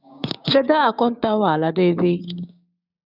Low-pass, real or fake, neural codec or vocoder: 5.4 kHz; fake; codec, 16 kHz, 6 kbps, DAC